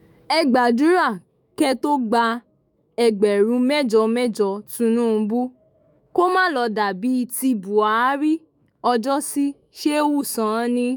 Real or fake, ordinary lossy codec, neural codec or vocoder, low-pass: fake; none; autoencoder, 48 kHz, 128 numbers a frame, DAC-VAE, trained on Japanese speech; none